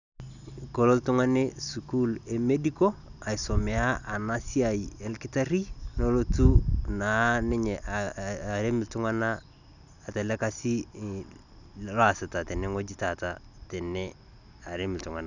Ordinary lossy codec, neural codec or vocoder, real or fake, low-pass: none; none; real; 7.2 kHz